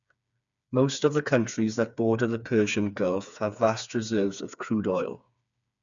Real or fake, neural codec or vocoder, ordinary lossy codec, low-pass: fake; codec, 16 kHz, 4 kbps, FreqCodec, smaller model; none; 7.2 kHz